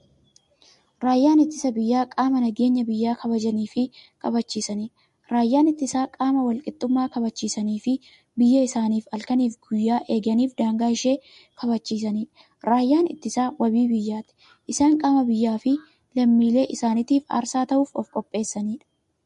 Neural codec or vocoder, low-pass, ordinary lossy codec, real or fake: none; 14.4 kHz; MP3, 48 kbps; real